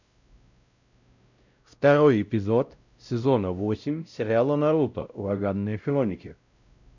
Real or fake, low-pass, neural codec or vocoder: fake; 7.2 kHz; codec, 16 kHz, 0.5 kbps, X-Codec, WavLM features, trained on Multilingual LibriSpeech